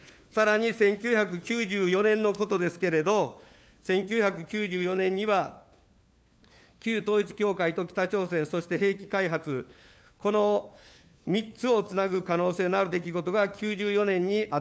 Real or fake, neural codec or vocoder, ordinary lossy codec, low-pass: fake; codec, 16 kHz, 4 kbps, FunCodec, trained on LibriTTS, 50 frames a second; none; none